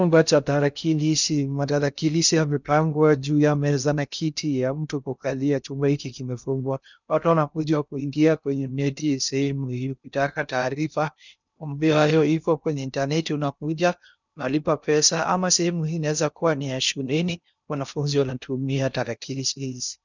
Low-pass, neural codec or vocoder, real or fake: 7.2 kHz; codec, 16 kHz in and 24 kHz out, 0.6 kbps, FocalCodec, streaming, 2048 codes; fake